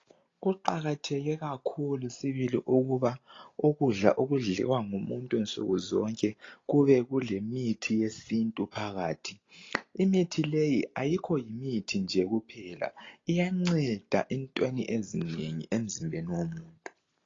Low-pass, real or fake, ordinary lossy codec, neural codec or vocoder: 7.2 kHz; real; AAC, 32 kbps; none